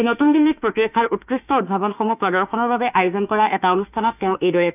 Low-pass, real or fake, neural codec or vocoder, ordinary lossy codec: 3.6 kHz; fake; autoencoder, 48 kHz, 32 numbers a frame, DAC-VAE, trained on Japanese speech; none